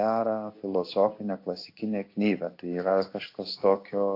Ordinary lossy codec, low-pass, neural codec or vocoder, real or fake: AAC, 32 kbps; 5.4 kHz; codec, 16 kHz in and 24 kHz out, 1 kbps, XY-Tokenizer; fake